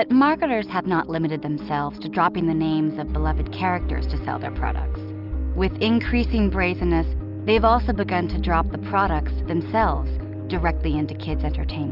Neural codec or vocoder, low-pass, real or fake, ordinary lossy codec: none; 5.4 kHz; real; Opus, 24 kbps